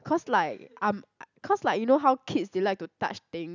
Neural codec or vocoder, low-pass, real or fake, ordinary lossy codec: none; 7.2 kHz; real; none